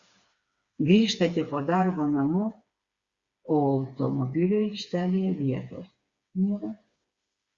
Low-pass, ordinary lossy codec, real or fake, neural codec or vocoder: 7.2 kHz; Opus, 64 kbps; fake; codec, 16 kHz, 4 kbps, FreqCodec, smaller model